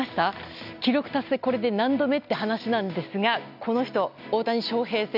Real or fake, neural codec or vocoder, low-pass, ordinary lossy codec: real; none; 5.4 kHz; none